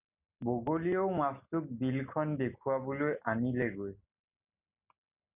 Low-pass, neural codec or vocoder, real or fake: 3.6 kHz; none; real